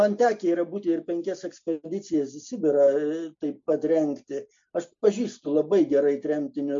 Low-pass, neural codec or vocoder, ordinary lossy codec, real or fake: 7.2 kHz; none; MP3, 48 kbps; real